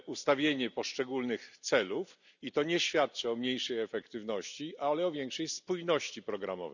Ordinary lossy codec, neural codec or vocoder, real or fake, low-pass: none; none; real; 7.2 kHz